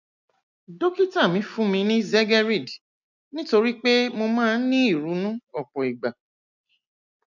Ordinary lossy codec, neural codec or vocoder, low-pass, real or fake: none; none; 7.2 kHz; real